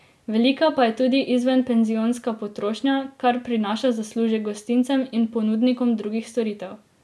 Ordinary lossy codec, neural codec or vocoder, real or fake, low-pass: none; none; real; none